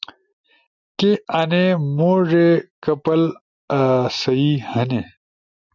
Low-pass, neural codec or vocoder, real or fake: 7.2 kHz; none; real